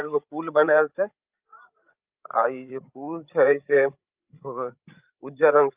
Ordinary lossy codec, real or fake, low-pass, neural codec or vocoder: Opus, 32 kbps; fake; 3.6 kHz; codec, 16 kHz, 16 kbps, FreqCodec, larger model